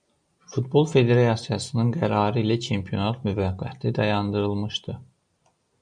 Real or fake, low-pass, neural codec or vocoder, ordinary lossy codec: real; 9.9 kHz; none; MP3, 96 kbps